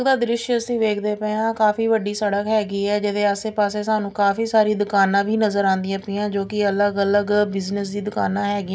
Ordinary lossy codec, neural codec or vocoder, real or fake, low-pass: none; none; real; none